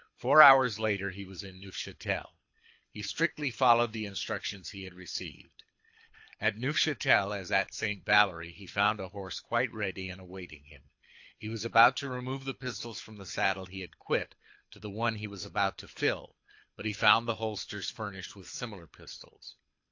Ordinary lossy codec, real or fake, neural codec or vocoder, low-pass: AAC, 48 kbps; fake; codec, 24 kHz, 6 kbps, HILCodec; 7.2 kHz